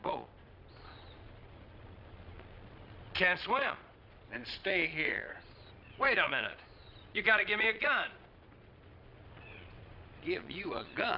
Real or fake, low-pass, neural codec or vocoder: fake; 5.4 kHz; vocoder, 22.05 kHz, 80 mel bands, Vocos